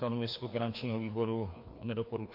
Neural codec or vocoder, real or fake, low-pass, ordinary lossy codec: codec, 16 kHz, 2 kbps, FreqCodec, larger model; fake; 5.4 kHz; MP3, 32 kbps